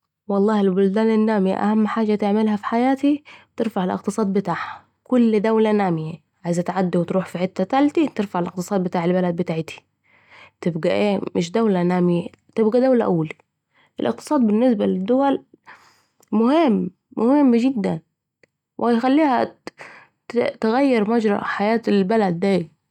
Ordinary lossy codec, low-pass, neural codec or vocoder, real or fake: none; 19.8 kHz; none; real